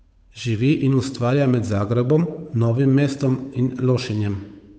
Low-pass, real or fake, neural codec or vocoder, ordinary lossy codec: none; fake; codec, 16 kHz, 8 kbps, FunCodec, trained on Chinese and English, 25 frames a second; none